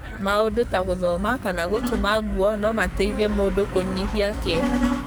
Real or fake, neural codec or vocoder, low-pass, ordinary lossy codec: fake; codec, 44.1 kHz, 2.6 kbps, SNAC; none; none